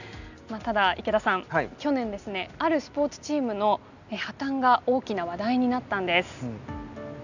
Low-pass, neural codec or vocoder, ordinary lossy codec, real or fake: 7.2 kHz; none; none; real